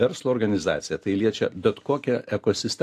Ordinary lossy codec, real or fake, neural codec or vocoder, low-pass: AAC, 64 kbps; real; none; 14.4 kHz